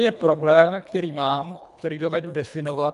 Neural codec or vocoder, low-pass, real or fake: codec, 24 kHz, 1.5 kbps, HILCodec; 10.8 kHz; fake